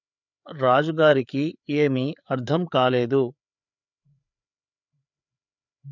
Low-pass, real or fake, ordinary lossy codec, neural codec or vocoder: 7.2 kHz; fake; none; codec, 16 kHz, 4 kbps, FreqCodec, larger model